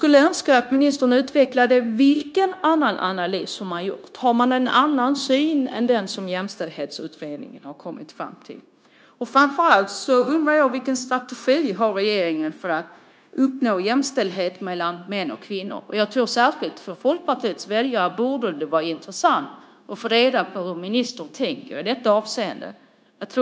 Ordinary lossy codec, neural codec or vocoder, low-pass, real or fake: none; codec, 16 kHz, 0.9 kbps, LongCat-Audio-Codec; none; fake